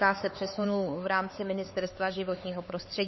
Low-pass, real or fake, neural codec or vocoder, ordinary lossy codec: 7.2 kHz; fake; codec, 16 kHz, 4 kbps, X-Codec, HuBERT features, trained on LibriSpeech; MP3, 24 kbps